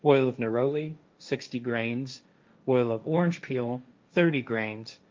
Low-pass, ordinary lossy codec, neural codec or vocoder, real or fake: 7.2 kHz; Opus, 24 kbps; codec, 16 kHz, 1.1 kbps, Voila-Tokenizer; fake